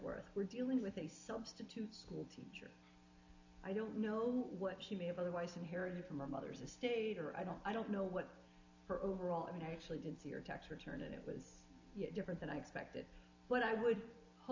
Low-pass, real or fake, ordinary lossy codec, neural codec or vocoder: 7.2 kHz; real; AAC, 48 kbps; none